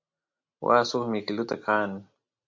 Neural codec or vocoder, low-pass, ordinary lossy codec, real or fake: none; 7.2 kHz; MP3, 64 kbps; real